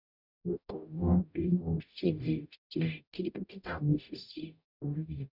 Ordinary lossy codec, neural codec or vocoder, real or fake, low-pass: none; codec, 44.1 kHz, 0.9 kbps, DAC; fake; 5.4 kHz